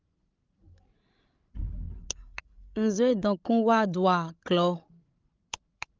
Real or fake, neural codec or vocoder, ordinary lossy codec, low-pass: fake; codec, 16 kHz, 16 kbps, FreqCodec, larger model; Opus, 32 kbps; 7.2 kHz